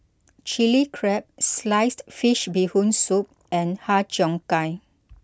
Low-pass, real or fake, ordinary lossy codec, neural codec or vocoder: none; real; none; none